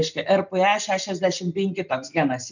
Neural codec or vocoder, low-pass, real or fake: none; 7.2 kHz; real